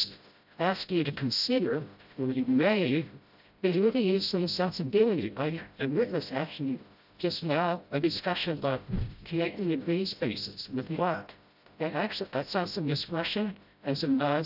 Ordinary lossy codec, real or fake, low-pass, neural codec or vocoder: AAC, 48 kbps; fake; 5.4 kHz; codec, 16 kHz, 0.5 kbps, FreqCodec, smaller model